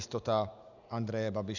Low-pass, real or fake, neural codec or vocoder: 7.2 kHz; real; none